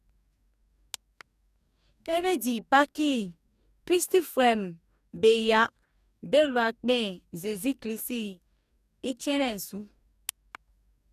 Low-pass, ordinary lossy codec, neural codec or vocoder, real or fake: 14.4 kHz; none; codec, 44.1 kHz, 2.6 kbps, DAC; fake